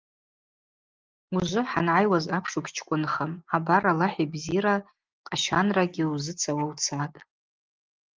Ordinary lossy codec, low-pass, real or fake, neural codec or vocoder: Opus, 32 kbps; 7.2 kHz; real; none